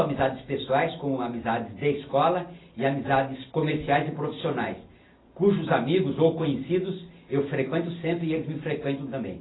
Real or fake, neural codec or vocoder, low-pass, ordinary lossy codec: real; none; 7.2 kHz; AAC, 16 kbps